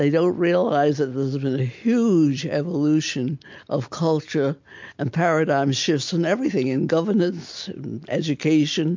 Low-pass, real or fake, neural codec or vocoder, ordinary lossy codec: 7.2 kHz; real; none; MP3, 48 kbps